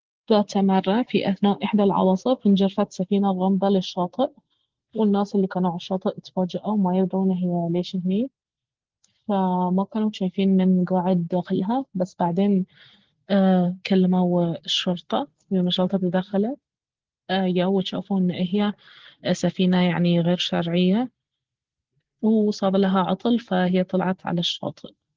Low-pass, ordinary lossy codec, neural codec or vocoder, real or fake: 7.2 kHz; Opus, 16 kbps; none; real